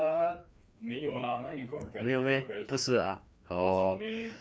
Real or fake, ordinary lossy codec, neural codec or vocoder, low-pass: fake; none; codec, 16 kHz, 2 kbps, FreqCodec, larger model; none